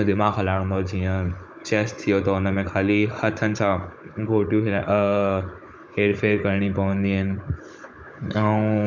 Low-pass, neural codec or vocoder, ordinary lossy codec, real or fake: none; codec, 16 kHz, 16 kbps, FunCodec, trained on Chinese and English, 50 frames a second; none; fake